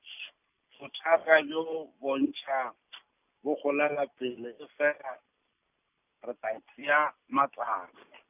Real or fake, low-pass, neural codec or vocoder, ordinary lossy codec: real; 3.6 kHz; none; none